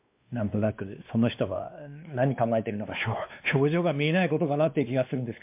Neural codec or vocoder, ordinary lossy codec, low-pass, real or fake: codec, 16 kHz, 2 kbps, X-Codec, WavLM features, trained on Multilingual LibriSpeech; MP3, 32 kbps; 3.6 kHz; fake